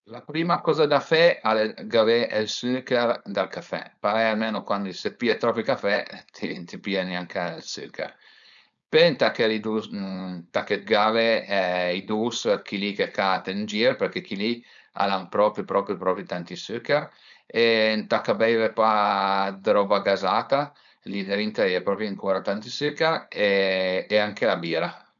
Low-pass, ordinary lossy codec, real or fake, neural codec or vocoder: 7.2 kHz; none; fake; codec, 16 kHz, 4.8 kbps, FACodec